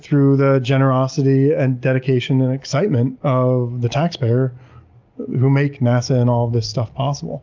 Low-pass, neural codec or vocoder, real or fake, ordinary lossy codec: 7.2 kHz; none; real; Opus, 24 kbps